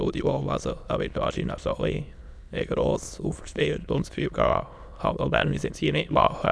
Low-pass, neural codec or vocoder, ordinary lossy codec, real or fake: none; autoencoder, 22.05 kHz, a latent of 192 numbers a frame, VITS, trained on many speakers; none; fake